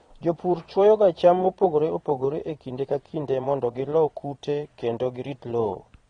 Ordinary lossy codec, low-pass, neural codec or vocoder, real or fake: AAC, 32 kbps; 9.9 kHz; vocoder, 22.05 kHz, 80 mel bands, Vocos; fake